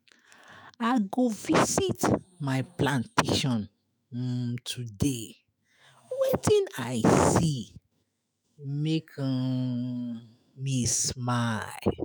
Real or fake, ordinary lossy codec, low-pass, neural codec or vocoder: fake; none; none; autoencoder, 48 kHz, 128 numbers a frame, DAC-VAE, trained on Japanese speech